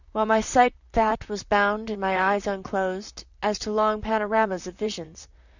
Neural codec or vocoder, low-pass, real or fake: vocoder, 44.1 kHz, 128 mel bands, Pupu-Vocoder; 7.2 kHz; fake